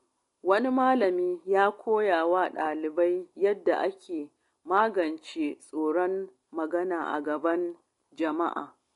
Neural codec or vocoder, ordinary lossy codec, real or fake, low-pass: none; AAC, 48 kbps; real; 10.8 kHz